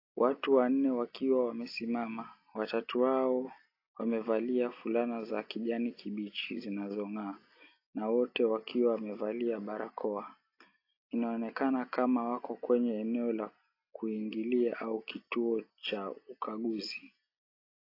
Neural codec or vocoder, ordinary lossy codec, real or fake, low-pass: none; AAC, 32 kbps; real; 5.4 kHz